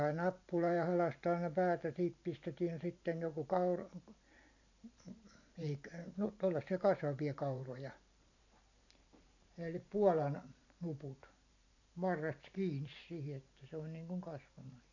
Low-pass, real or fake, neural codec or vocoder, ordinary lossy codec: 7.2 kHz; fake; vocoder, 44.1 kHz, 128 mel bands every 512 samples, BigVGAN v2; AAC, 48 kbps